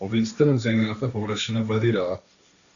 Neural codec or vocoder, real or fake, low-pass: codec, 16 kHz, 1.1 kbps, Voila-Tokenizer; fake; 7.2 kHz